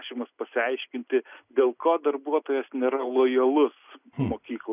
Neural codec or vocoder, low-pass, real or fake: none; 3.6 kHz; real